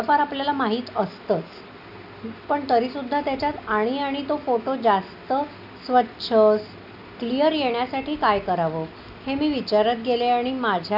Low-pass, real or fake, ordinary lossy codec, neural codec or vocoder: 5.4 kHz; real; none; none